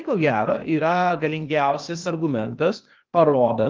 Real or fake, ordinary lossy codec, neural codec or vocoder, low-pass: fake; Opus, 32 kbps; codec, 16 kHz, 0.8 kbps, ZipCodec; 7.2 kHz